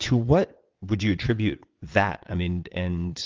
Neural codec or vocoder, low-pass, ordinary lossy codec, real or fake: vocoder, 22.05 kHz, 80 mel bands, WaveNeXt; 7.2 kHz; Opus, 24 kbps; fake